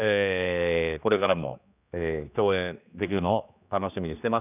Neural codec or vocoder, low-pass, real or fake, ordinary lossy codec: codec, 16 kHz, 2 kbps, X-Codec, HuBERT features, trained on general audio; 3.6 kHz; fake; none